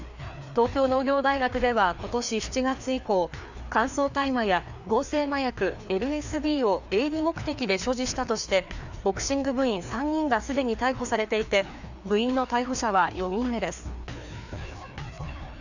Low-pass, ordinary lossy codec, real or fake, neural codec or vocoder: 7.2 kHz; none; fake; codec, 16 kHz, 2 kbps, FreqCodec, larger model